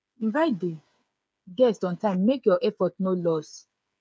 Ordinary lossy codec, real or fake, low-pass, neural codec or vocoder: none; fake; none; codec, 16 kHz, 8 kbps, FreqCodec, smaller model